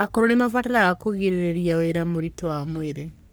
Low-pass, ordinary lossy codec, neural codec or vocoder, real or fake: none; none; codec, 44.1 kHz, 3.4 kbps, Pupu-Codec; fake